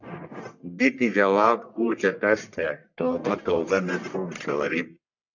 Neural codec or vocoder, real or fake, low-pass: codec, 44.1 kHz, 1.7 kbps, Pupu-Codec; fake; 7.2 kHz